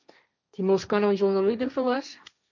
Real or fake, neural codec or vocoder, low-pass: fake; codec, 16 kHz, 1.1 kbps, Voila-Tokenizer; 7.2 kHz